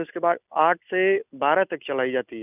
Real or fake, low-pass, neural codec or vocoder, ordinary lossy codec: real; 3.6 kHz; none; none